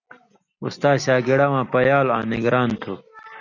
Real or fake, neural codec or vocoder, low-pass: real; none; 7.2 kHz